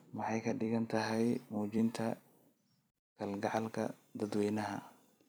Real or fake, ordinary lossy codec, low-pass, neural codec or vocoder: fake; none; none; vocoder, 44.1 kHz, 128 mel bands every 256 samples, BigVGAN v2